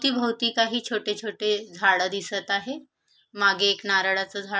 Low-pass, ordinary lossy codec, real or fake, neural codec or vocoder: none; none; real; none